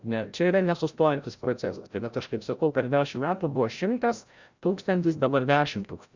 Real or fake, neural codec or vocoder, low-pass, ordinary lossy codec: fake; codec, 16 kHz, 0.5 kbps, FreqCodec, larger model; 7.2 kHz; Opus, 64 kbps